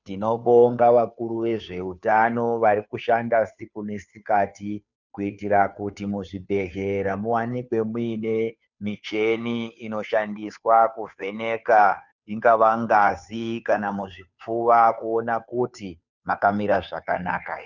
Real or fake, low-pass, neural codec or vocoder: fake; 7.2 kHz; codec, 16 kHz, 2 kbps, FunCodec, trained on Chinese and English, 25 frames a second